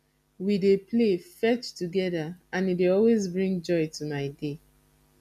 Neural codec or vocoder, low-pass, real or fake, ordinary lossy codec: none; 14.4 kHz; real; none